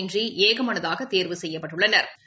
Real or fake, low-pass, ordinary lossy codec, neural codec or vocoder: real; none; none; none